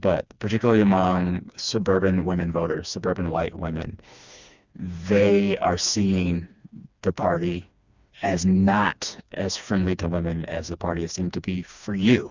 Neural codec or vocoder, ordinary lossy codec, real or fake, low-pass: codec, 16 kHz, 2 kbps, FreqCodec, smaller model; Opus, 64 kbps; fake; 7.2 kHz